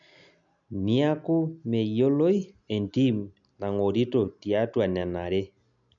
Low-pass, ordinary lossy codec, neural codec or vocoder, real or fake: 7.2 kHz; none; none; real